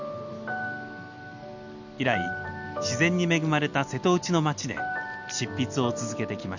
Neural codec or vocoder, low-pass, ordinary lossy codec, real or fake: none; 7.2 kHz; none; real